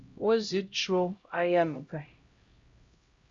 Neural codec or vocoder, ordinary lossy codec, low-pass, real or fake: codec, 16 kHz, 0.5 kbps, X-Codec, HuBERT features, trained on LibriSpeech; Opus, 64 kbps; 7.2 kHz; fake